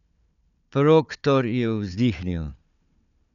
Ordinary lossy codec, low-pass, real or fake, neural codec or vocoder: none; 7.2 kHz; fake; codec, 16 kHz, 4 kbps, FunCodec, trained on Chinese and English, 50 frames a second